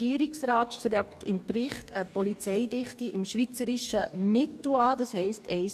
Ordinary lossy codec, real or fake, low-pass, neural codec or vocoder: none; fake; 14.4 kHz; codec, 44.1 kHz, 2.6 kbps, DAC